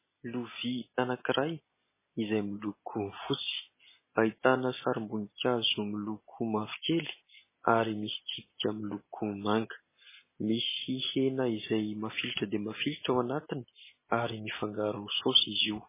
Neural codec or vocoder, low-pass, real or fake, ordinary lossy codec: none; 3.6 kHz; real; MP3, 16 kbps